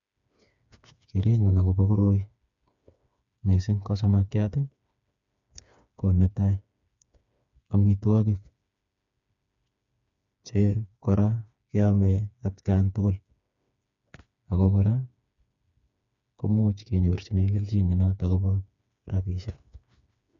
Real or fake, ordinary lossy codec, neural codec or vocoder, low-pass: fake; none; codec, 16 kHz, 4 kbps, FreqCodec, smaller model; 7.2 kHz